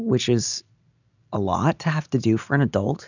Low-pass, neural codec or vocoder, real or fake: 7.2 kHz; none; real